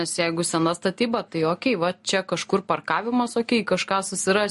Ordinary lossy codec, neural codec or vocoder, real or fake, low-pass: MP3, 48 kbps; none; real; 14.4 kHz